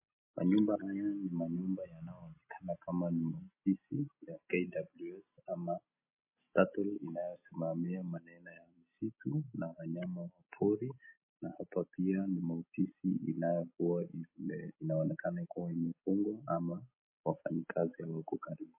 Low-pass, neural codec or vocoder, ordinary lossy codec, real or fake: 3.6 kHz; none; MP3, 24 kbps; real